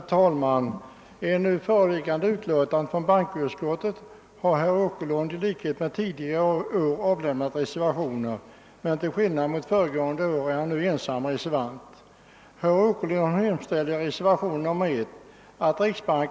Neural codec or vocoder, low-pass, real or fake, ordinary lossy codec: none; none; real; none